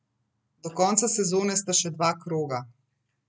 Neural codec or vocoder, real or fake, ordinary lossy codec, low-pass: none; real; none; none